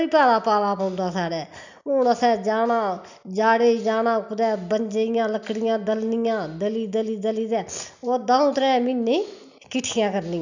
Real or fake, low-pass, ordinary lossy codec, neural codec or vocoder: real; 7.2 kHz; none; none